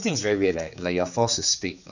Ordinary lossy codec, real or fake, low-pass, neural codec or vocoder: none; fake; 7.2 kHz; codec, 16 kHz, 4 kbps, X-Codec, HuBERT features, trained on general audio